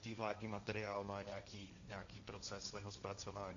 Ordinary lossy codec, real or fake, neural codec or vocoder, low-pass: MP3, 64 kbps; fake; codec, 16 kHz, 1.1 kbps, Voila-Tokenizer; 7.2 kHz